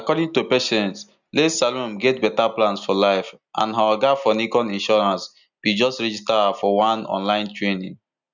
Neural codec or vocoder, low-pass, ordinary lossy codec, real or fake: none; 7.2 kHz; none; real